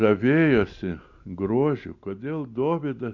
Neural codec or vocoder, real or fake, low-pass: none; real; 7.2 kHz